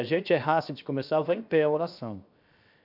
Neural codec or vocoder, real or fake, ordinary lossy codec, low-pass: codec, 16 kHz, 0.7 kbps, FocalCodec; fake; none; 5.4 kHz